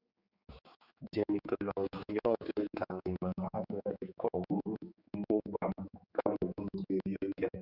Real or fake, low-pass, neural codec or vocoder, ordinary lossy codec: fake; 5.4 kHz; codec, 16 kHz, 4 kbps, X-Codec, HuBERT features, trained on general audio; Opus, 64 kbps